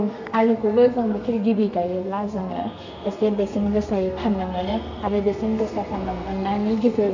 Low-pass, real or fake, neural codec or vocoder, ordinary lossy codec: 7.2 kHz; fake; codec, 32 kHz, 1.9 kbps, SNAC; none